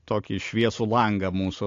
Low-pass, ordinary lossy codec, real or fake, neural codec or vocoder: 7.2 kHz; AAC, 48 kbps; real; none